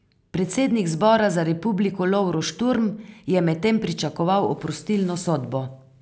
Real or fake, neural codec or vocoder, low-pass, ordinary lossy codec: real; none; none; none